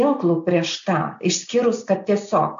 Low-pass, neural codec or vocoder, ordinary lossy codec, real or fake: 7.2 kHz; none; AAC, 64 kbps; real